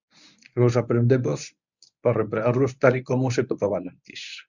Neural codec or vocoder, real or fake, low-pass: codec, 24 kHz, 0.9 kbps, WavTokenizer, medium speech release version 1; fake; 7.2 kHz